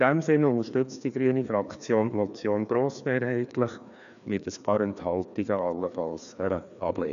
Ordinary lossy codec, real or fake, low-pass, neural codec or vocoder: none; fake; 7.2 kHz; codec, 16 kHz, 2 kbps, FreqCodec, larger model